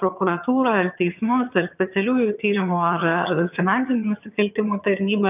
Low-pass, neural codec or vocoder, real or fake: 3.6 kHz; vocoder, 22.05 kHz, 80 mel bands, HiFi-GAN; fake